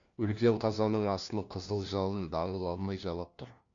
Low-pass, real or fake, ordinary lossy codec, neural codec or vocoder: 7.2 kHz; fake; AAC, 64 kbps; codec, 16 kHz, 1 kbps, FunCodec, trained on LibriTTS, 50 frames a second